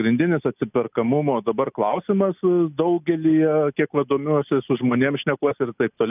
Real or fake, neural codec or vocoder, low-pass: real; none; 3.6 kHz